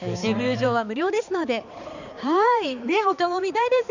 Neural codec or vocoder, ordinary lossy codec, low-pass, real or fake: codec, 16 kHz, 4 kbps, X-Codec, HuBERT features, trained on balanced general audio; none; 7.2 kHz; fake